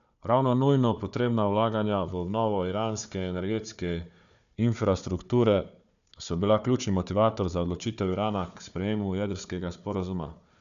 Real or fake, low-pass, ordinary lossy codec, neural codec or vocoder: fake; 7.2 kHz; none; codec, 16 kHz, 4 kbps, FunCodec, trained on Chinese and English, 50 frames a second